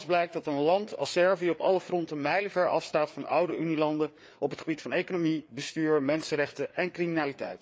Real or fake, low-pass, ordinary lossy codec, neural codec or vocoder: fake; none; none; codec, 16 kHz, 4 kbps, FreqCodec, larger model